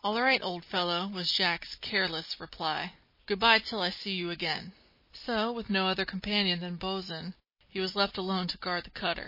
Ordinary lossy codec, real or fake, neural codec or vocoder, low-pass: MP3, 24 kbps; real; none; 5.4 kHz